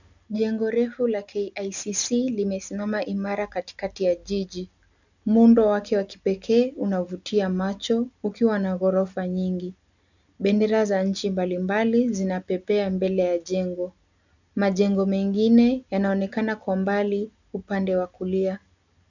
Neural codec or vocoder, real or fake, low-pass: none; real; 7.2 kHz